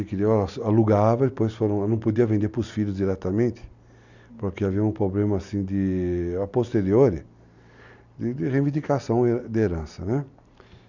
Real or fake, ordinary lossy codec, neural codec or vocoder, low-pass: real; none; none; 7.2 kHz